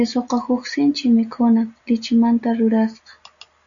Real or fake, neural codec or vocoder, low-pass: real; none; 7.2 kHz